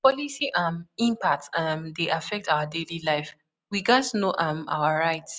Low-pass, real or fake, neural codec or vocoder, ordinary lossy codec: none; real; none; none